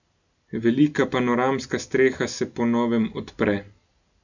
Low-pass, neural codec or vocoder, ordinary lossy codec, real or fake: 7.2 kHz; none; none; real